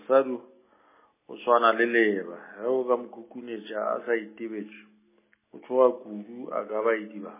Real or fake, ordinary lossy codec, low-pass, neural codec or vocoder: real; MP3, 16 kbps; 3.6 kHz; none